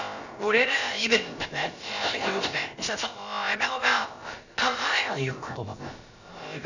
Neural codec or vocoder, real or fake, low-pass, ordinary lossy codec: codec, 16 kHz, about 1 kbps, DyCAST, with the encoder's durations; fake; 7.2 kHz; none